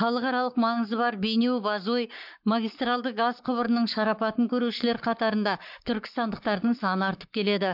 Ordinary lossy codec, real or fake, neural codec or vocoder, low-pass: MP3, 48 kbps; fake; codec, 44.1 kHz, 7.8 kbps, Pupu-Codec; 5.4 kHz